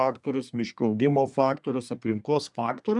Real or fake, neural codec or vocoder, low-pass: fake; codec, 24 kHz, 1 kbps, SNAC; 10.8 kHz